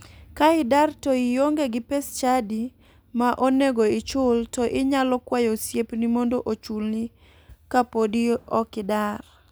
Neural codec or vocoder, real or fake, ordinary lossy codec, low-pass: none; real; none; none